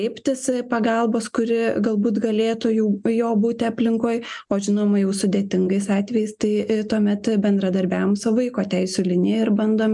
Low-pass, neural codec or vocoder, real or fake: 10.8 kHz; none; real